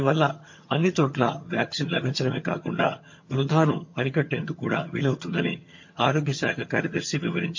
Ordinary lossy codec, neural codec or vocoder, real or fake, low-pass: MP3, 48 kbps; vocoder, 22.05 kHz, 80 mel bands, HiFi-GAN; fake; 7.2 kHz